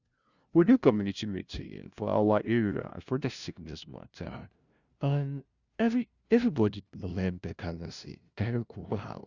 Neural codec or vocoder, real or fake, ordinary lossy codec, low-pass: codec, 16 kHz, 0.5 kbps, FunCodec, trained on LibriTTS, 25 frames a second; fake; Opus, 64 kbps; 7.2 kHz